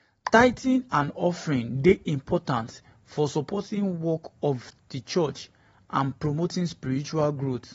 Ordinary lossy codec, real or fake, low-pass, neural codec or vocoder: AAC, 24 kbps; fake; 19.8 kHz; vocoder, 44.1 kHz, 128 mel bands every 512 samples, BigVGAN v2